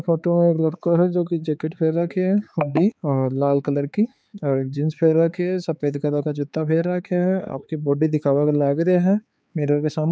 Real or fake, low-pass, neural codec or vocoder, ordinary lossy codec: fake; none; codec, 16 kHz, 4 kbps, X-Codec, HuBERT features, trained on balanced general audio; none